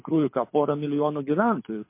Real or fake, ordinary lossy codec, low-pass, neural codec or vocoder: fake; MP3, 24 kbps; 3.6 kHz; codec, 24 kHz, 6 kbps, HILCodec